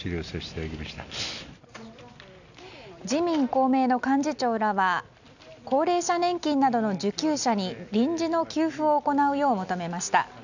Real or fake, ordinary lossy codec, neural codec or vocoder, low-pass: real; none; none; 7.2 kHz